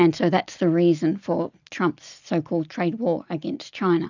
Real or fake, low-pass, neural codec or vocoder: real; 7.2 kHz; none